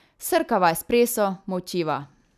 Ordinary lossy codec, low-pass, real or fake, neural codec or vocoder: none; 14.4 kHz; real; none